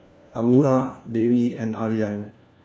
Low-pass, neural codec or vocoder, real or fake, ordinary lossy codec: none; codec, 16 kHz, 1 kbps, FunCodec, trained on LibriTTS, 50 frames a second; fake; none